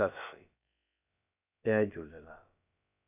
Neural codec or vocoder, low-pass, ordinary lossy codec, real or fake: codec, 16 kHz, about 1 kbps, DyCAST, with the encoder's durations; 3.6 kHz; none; fake